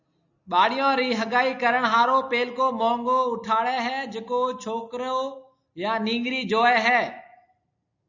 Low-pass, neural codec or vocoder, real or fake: 7.2 kHz; none; real